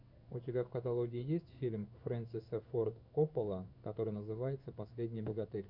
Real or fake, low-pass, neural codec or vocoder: fake; 5.4 kHz; codec, 16 kHz in and 24 kHz out, 1 kbps, XY-Tokenizer